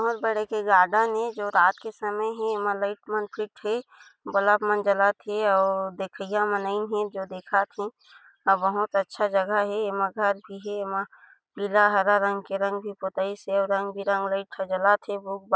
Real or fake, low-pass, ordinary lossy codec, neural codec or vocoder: real; none; none; none